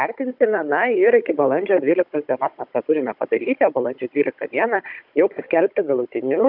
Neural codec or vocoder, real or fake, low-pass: codec, 16 kHz, 16 kbps, FunCodec, trained on Chinese and English, 50 frames a second; fake; 5.4 kHz